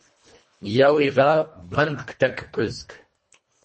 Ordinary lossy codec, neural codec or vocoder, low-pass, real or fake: MP3, 32 kbps; codec, 24 kHz, 1.5 kbps, HILCodec; 10.8 kHz; fake